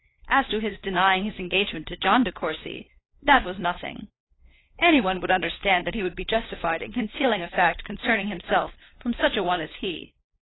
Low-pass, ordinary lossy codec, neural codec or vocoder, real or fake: 7.2 kHz; AAC, 16 kbps; codec, 16 kHz, 4 kbps, FunCodec, trained on LibriTTS, 50 frames a second; fake